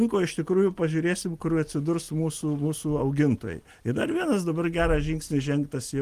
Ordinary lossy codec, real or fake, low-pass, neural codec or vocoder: Opus, 24 kbps; real; 14.4 kHz; none